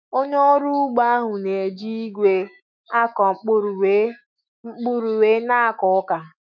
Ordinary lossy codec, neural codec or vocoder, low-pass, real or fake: none; autoencoder, 48 kHz, 128 numbers a frame, DAC-VAE, trained on Japanese speech; 7.2 kHz; fake